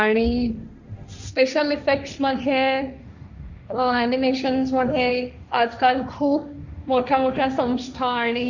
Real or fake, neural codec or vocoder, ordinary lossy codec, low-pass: fake; codec, 16 kHz, 1.1 kbps, Voila-Tokenizer; none; 7.2 kHz